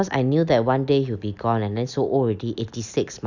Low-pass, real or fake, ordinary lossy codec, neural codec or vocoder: 7.2 kHz; real; none; none